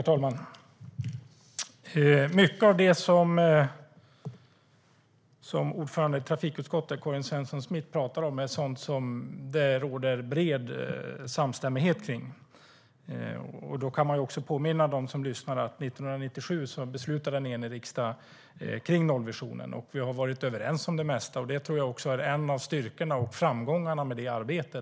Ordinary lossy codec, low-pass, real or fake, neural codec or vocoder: none; none; real; none